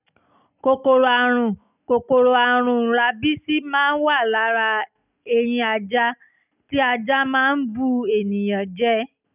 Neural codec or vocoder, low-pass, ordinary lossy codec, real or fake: none; 3.6 kHz; none; real